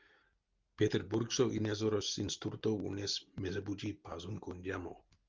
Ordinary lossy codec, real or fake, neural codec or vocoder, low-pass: Opus, 32 kbps; real; none; 7.2 kHz